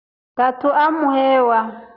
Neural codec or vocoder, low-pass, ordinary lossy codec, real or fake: none; 5.4 kHz; Opus, 64 kbps; real